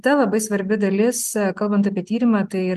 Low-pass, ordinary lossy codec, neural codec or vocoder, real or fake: 14.4 kHz; Opus, 24 kbps; none; real